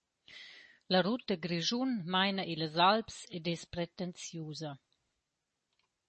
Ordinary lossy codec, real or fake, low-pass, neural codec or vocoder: MP3, 32 kbps; real; 10.8 kHz; none